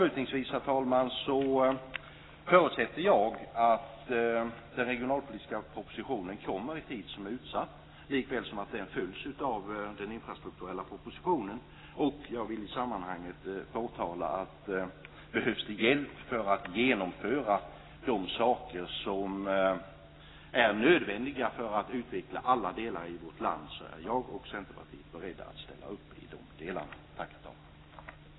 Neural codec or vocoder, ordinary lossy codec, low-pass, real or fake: none; AAC, 16 kbps; 7.2 kHz; real